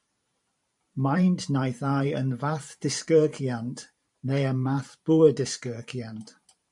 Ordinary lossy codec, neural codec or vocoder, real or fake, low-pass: MP3, 96 kbps; vocoder, 44.1 kHz, 128 mel bands every 512 samples, BigVGAN v2; fake; 10.8 kHz